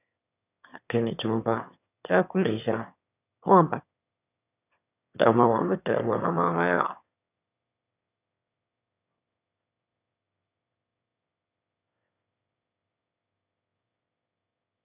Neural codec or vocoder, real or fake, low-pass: autoencoder, 22.05 kHz, a latent of 192 numbers a frame, VITS, trained on one speaker; fake; 3.6 kHz